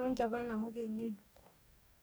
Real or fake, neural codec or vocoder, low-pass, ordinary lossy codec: fake; codec, 44.1 kHz, 2.6 kbps, DAC; none; none